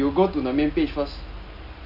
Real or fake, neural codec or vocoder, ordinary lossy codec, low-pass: real; none; none; 5.4 kHz